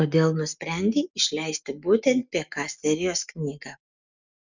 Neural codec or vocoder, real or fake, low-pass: none; real; 7.2 kHz